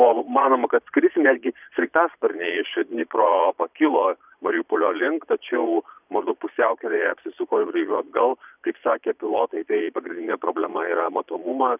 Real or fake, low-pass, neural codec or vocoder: fake; 3.6 kHz; vocoder, 22.05 kHz, 80 mel bands, Vocos